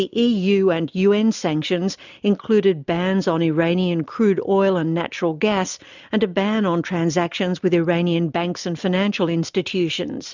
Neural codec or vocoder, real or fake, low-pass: none; real; 7.2 kHz